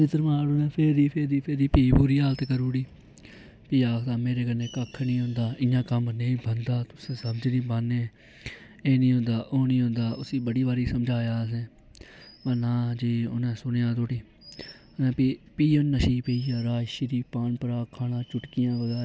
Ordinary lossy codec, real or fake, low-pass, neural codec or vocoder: none; real; none; none